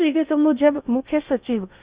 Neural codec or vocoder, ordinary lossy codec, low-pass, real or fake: codec, 16 kHz in and 24 kHz out, 0.6 kbps, FocalCodec, streaming, 2048 codes; Opus, 64 kbps; 3.6 kHz; fake